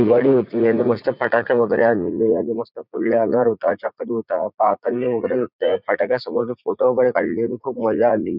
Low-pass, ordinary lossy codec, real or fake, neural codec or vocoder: 5.4 kHz; none; fake; vocoder, 44.1 kHz, 80 mel bands, Vocos